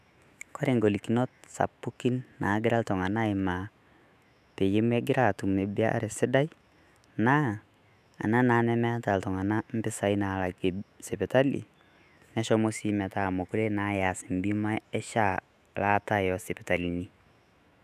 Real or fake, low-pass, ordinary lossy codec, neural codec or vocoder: fake; 14.4 kHz; none; autoencoder, 48 kHz, 128 numbers a frame, DAC-VAE, trained on Japanese speech